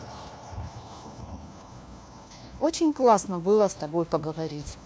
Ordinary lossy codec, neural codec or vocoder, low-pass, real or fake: none; codec, 16 kHz, 1 kbps, FunCodec, trained on LibriTTS, 50 frames a second; none; fake